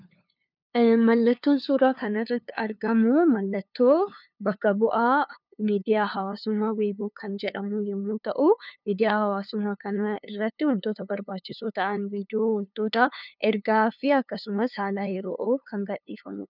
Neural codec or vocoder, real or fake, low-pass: codec, 16 kHz, 2 kbps, FunCodec, trained on LibriTTS, 25 frames a second; fake; 5.4 kHz